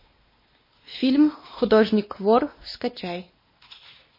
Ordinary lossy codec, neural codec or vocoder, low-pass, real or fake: MP3, 24 kbps; codec, 16 kHz, 2 kbps, X-Codec, WavLM features, trained on Multilingual LibriSpeech; 5.4 kHz; fake